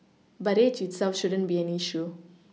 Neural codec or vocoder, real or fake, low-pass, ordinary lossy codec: none; real; none; none